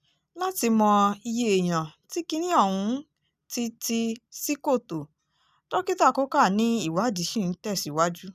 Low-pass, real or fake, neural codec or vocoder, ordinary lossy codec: 14.4 kHz; real; none; none